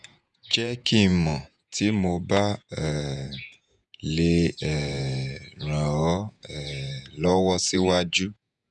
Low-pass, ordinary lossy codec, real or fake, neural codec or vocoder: 10.8 kHz; none; fake; vocoder, 48 kHz, 128 mel bands, Vocos